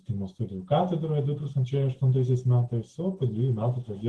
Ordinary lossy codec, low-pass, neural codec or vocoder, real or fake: Opus, 16 kbps; 10.8 kHz; none; real